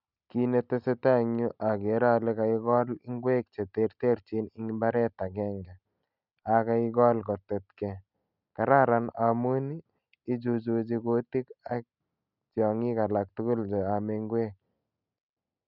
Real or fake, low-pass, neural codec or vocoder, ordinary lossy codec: real; 5.4 kHz; none; none